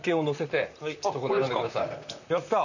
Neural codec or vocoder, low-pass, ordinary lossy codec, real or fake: vocoder, 44.1 kHz, 128 mel bands, Pupu-Vocoder; 7.2 kHz; none; fake